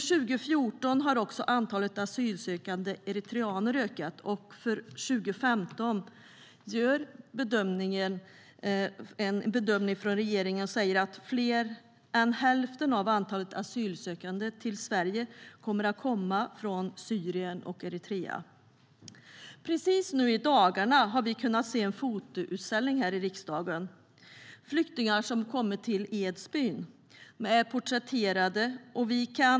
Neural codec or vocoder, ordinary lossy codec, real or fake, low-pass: none; none; real; none